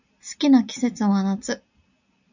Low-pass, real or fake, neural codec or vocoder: 7.2 kHz; real; none